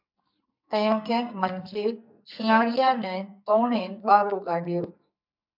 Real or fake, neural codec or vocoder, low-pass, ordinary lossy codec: fake; codec, 44.1 kHz, 2.6 kbps, SNAC; 5.4 kHz; MP3, 48 kbps